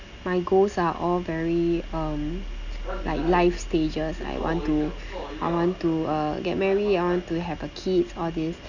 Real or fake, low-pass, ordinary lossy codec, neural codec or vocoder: real; 7.2 kHz; none; none